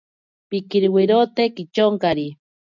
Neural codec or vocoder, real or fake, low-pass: none; real; 7.2 kHz